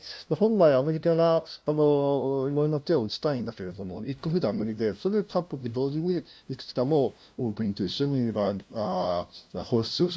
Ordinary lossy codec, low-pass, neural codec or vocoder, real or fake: none; none; codec, 16 kHz, 0.5 kbps, FunCodec, trained on LibriTTS, 25 frames a second; fake